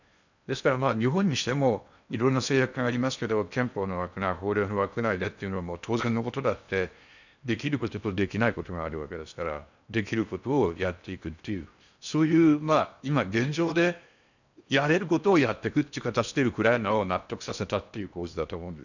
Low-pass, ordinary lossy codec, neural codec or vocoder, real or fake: 7.2 kHz; none; codec, 16 kHz in and 24 kHz out, 0.8 kbps, FocalCodec, streaming, 65536 codes; fake